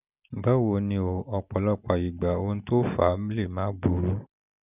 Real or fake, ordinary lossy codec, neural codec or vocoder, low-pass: real; none; none; 3.6 kHz